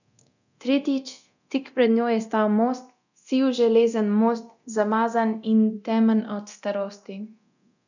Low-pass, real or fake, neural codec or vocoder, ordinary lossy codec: 7.2 kHz; fake; codec, 24 kHz, 0.9 kbps, DualCodec; none